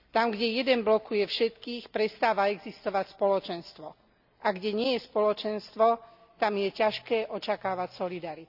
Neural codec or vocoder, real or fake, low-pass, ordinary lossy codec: none; real; 5.4 kHz; none